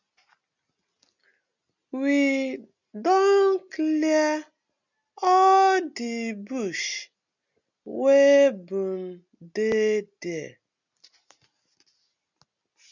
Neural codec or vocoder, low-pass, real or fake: none; 7.2 kHz; real